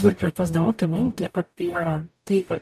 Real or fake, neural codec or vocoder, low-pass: fake; codec, 44.1 kHz, 0.9 kbps, DAC; 14.4 kHz